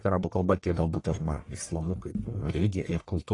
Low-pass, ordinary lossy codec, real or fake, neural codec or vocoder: 10.8 kHz; AAC, 32 kbps; fake; codec, 44.1 kHz, 1.7 kbps, Pupu-Codec